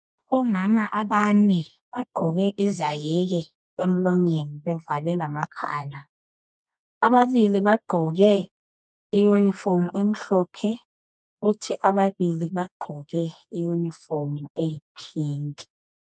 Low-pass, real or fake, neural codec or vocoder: 9.9 kHz; fake; codec, 24 kHz, 0.9 kbps, WavTokenizer, medium music audio release